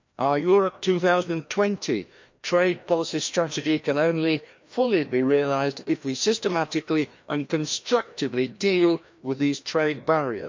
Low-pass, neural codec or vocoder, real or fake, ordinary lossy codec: 7.2 kHz; codec, 16 kHz, 1 kbps, FreqCodec, larger model; fake; MP3, 64 kbps